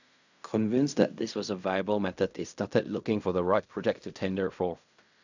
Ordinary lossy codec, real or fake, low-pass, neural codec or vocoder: none; fake; 7.2 kHz; codec, 16 kHz in and 24 kHz out, 0.4 kbps, LongCat-Audio-Codec, fine tuned four codebook decoder